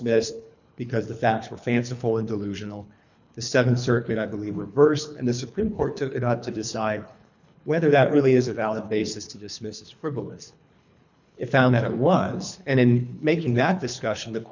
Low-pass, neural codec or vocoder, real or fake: 7.2 kHz; codec, 24 kHz, 3 kbps, HILCodec; fake